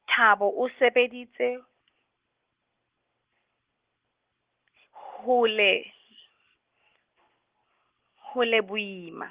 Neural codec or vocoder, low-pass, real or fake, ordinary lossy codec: none; 3.6 kHz; real; Opus, 16 kbps